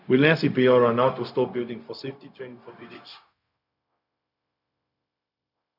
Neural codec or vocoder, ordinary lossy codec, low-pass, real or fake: codec, 16 kHz, 0.4 kbps, LongCat-Audio-Codec; none; 5.4 kHz; fake